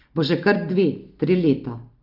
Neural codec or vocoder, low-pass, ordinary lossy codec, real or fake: none; 5.4 kHz; Opus, 24 kbps; real